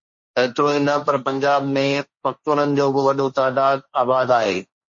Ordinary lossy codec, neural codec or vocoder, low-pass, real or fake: MP3, 32 kbps; codec, 16 kHz, 1.1 kbps, Voila-Tokenizer; 7.2 kHz; fake